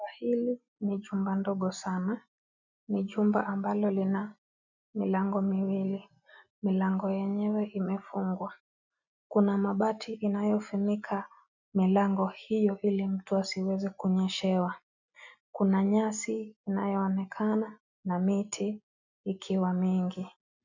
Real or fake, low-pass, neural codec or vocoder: real; 7.2 kHz; none